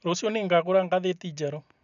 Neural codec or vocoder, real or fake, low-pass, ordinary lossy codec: none; real; 7.2 kHz; none